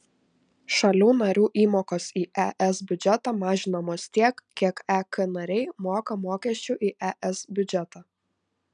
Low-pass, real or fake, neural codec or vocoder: 9.9 kHz; real; none